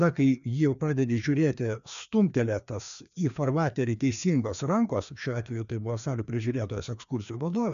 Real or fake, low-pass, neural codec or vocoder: fake; 7.2 kHz; codec, 16 kHz, 2 kbps, FreqCodec, larger model